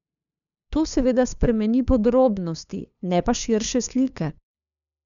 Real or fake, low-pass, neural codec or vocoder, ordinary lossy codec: fake; 7.2 kHz; codec, 16 kHz, 2 kbps, FunCodec, trained on LibriTTS, 25 frames a second; none